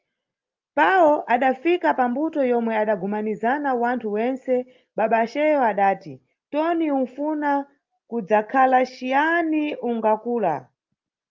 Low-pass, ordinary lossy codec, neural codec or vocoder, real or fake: 7.2 kHz; Opus, 24 kbps; none; real